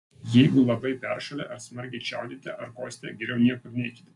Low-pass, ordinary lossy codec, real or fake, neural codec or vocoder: 10.8 kHz; MP3, 64 kbps; real; none